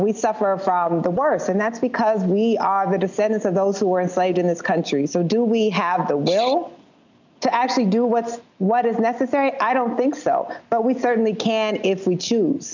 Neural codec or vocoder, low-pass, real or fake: none; 7.2 kHz; real